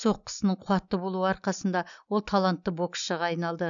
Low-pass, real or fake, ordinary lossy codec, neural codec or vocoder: 7.2 kHz; real; none; none